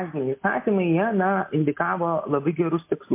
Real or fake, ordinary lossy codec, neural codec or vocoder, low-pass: real; MP3, 24 kbps; none; 3.6 kHz